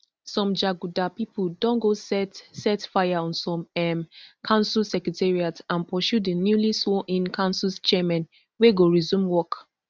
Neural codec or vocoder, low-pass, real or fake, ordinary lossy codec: none; none; real; none